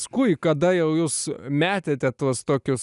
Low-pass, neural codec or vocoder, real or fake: 10.8 kHz; none; real